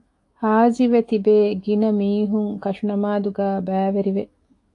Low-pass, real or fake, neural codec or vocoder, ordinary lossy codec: 10.8 kHz; fake; autoencoder, 48 kHz, 128 numbers a frame, DAC-VAE, trained on Japanese speech; AAC, 48 kbps